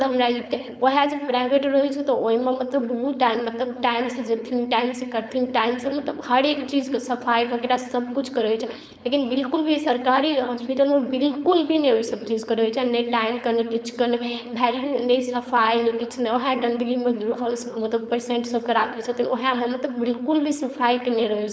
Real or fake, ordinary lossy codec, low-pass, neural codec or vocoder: fake; none; none; codec, 16 kHz, 4.8 kbps, FACodec